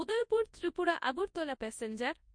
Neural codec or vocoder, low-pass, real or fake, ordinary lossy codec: codec, 24 kHz, 0.9 kbps, WavTokenizer, large speech release; 9.9 kHz; fake; MP3, 48 kbps